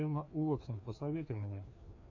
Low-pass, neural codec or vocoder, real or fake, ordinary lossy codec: 7.2 kHz; codec, 16 kHz, 2 kbps, FreqCodec, larger model; fake; AAC, 48 kbps